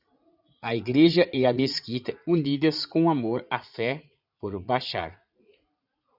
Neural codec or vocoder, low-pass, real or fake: vocoder, 44.1 kHz, 80 mel bands, Vocos; 5.4 kHz; fake